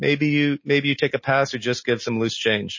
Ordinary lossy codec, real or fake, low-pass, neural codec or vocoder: MP3, 32 kbps; real; 7.2 kHz; none